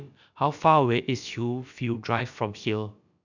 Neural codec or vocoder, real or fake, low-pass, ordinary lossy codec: codec, 16 kHz, about 1 kbps, DyCAST, with the encoder's durations; fake; 7.2 kHz; none